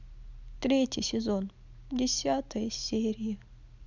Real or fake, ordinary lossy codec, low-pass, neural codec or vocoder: real; none; 7.2 kHz; none